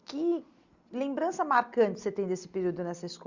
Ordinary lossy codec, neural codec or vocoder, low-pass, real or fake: Opus, 64 kbps; none; 7.2 kHz; real